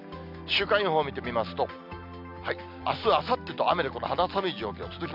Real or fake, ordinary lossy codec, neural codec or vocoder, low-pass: real; none; none; 5.4 kHz